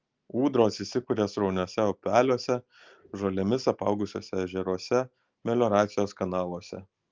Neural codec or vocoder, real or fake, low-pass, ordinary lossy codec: none; real; 7.2 kHz; Opus, 32 kbps